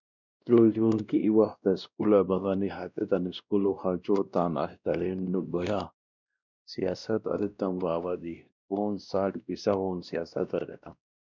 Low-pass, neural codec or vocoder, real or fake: 7.2 kHz; codec, 16 kHz, 1 kbps, X-Codec, WavLM features, trained on Multilingual LibriSpeech; fake